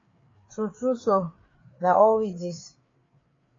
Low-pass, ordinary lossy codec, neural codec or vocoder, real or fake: 7.2 kHz; AAC, 32 kbps; codec, 16 kHz, 4 kbps, FreqCodec, larger model; fake